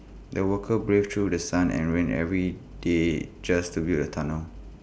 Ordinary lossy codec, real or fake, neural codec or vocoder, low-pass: none; real; none; none